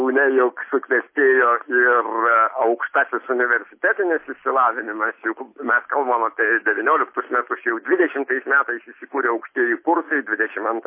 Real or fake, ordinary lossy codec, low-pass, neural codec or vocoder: real; MP3, 24 kbps; 3.6 kHz; none